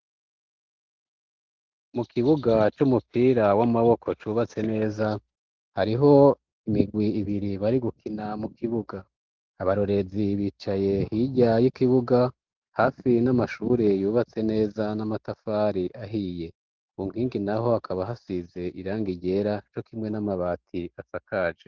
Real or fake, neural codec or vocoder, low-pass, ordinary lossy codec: real; none; 7.2 kHz; Opus, 16 kbps